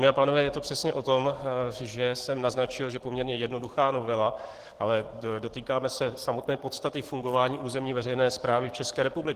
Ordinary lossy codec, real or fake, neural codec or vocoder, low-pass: Opus, 16 kbps; fake; codec, 44.1 kHz, 7.8 kbps, DAC; 14.4 kHz